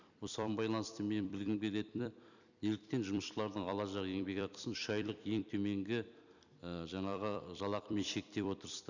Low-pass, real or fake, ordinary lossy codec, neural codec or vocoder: 7.2 kHz; fake; none; vocoder, 44.1 kHz, 128 mel bands every 256 samples, BigVGAN v2